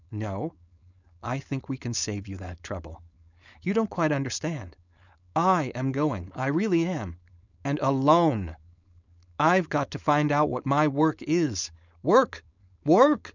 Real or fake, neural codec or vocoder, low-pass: fake; codec, 16 kHz, 4.8 kbps, FACodec; 7.2 kHz